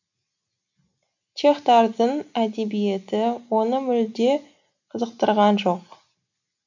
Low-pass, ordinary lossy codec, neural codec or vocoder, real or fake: 7.2 kHz; none; none; real